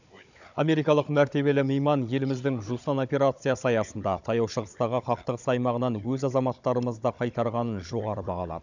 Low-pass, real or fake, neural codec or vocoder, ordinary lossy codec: 7.2 kHz; fake; codec, 16 kHz, 16 kbps, FunCodec, trained on Chinese and English, 50 frames a second; none